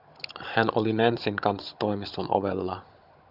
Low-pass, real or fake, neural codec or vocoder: 5.4 kHz; fake; codec, 16 kHz, 16 kbps, FunCodec, trained on Chinese and English, 50 frames a second